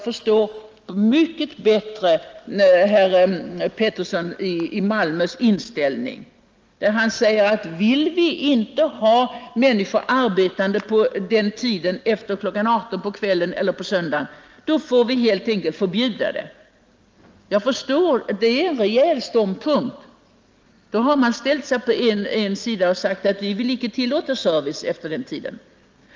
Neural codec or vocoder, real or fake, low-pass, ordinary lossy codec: none; real; 7.2 kHz; Opus, 24 kbps